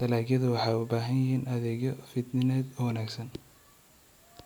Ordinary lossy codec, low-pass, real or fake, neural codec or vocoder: none; none; real; none